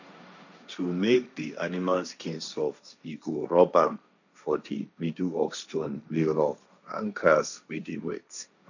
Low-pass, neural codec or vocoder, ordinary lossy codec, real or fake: 7.2 kHz; codec, 16 kHz, 1.1 kbps, Voila-Tokenizer; none; fake